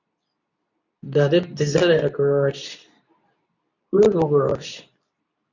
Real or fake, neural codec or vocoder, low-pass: fake; codec, 24 kHz, 0.9 kbps, WavTokenizer, medium speech release version 2; 7.2 kHz